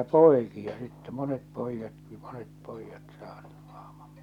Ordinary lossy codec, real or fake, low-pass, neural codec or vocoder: none; real; 19.8 kHz; none